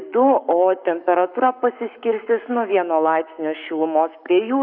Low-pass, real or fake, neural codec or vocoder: 5.4 kHz; fake; codec, 44.1 kHz, 7.8 kbps, Pupu-Codec